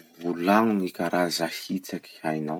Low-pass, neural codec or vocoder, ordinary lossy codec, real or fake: 19.8 kHz; none; MP3, 96 kbps; real